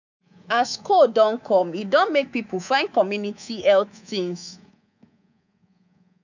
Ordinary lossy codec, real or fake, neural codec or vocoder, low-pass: none; fake; codec, 24 kHz, 3.1 kbps, DualCodec; 7.2 kHz